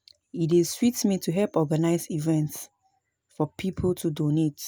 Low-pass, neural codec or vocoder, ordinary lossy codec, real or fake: none; none; none; real